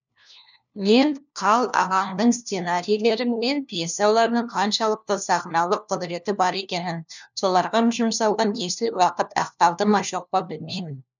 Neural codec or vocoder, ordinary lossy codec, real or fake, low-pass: codec, 16 kHz, 1 kbps, FunCodec, trained on LibriTTS, 50 frames a second; none; fake; 7.2 kHz